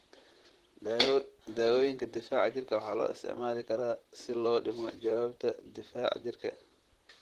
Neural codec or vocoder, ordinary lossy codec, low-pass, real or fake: vocoder, 44.1 kHz, 128 mel bands, Pupu-Vocoder; Opus, 16 kbps; 14.4 kHz; fake